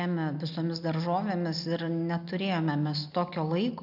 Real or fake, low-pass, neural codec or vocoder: real; 5.4 kHz; none